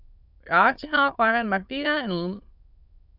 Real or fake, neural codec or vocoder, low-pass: fake; autoencoder, 22.05 kHz, a latent of 192 numbers a frame, VITS, trained on many speakers; 5.4 kHz